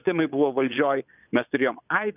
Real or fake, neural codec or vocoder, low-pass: fake; vocoder, 22.05 kHz, 80 mel bands, Vocos; 3.6 kHz